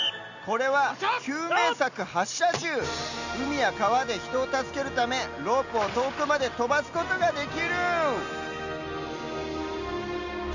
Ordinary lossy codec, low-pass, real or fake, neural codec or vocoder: Opus, 64 kbps; 7.2 kHz; real; none